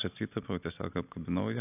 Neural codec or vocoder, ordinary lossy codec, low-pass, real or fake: none; AAC, 24 kbps; 3.6 kHz; real